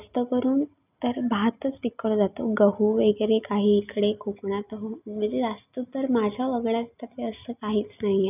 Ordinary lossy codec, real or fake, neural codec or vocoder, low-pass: none; real; none; 3.6 kHz